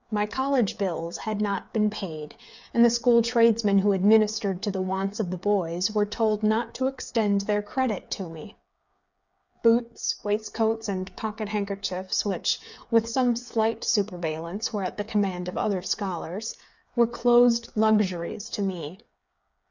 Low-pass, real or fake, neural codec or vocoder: 7.2 kHz; fake; codec, 16 kHz, 8 kbps, FreqCodec, smaller model